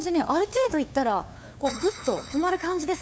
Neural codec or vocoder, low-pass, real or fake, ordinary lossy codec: codec, 16 kHz, 2 kbps, FunCodec, trained on LibriTTS, 25 frames a second; none; fake; none